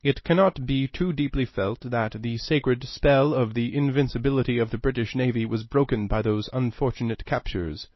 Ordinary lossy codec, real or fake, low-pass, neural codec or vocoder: MP3, 24 kbps; real; 7.2 kHz; none